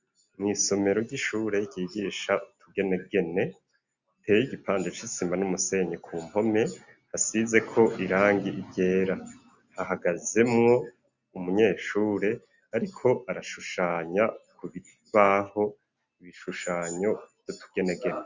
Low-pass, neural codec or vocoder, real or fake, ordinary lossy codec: 7.2 kHz; none; real; Opus, 64 kbps